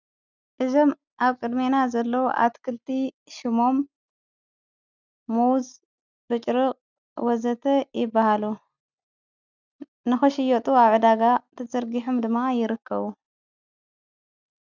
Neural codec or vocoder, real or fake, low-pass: none; real; 7.2 kHz